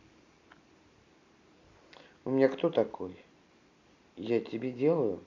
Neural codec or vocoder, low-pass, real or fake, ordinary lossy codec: none; 7.2 kHz; real; AAC, 48 kbps